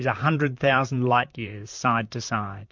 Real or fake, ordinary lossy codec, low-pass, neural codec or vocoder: fake; MP3, 48 kbps; 7.2 kHz; vocoder, 44.1 kHz, 128 mel bands, Pupu-Vocoder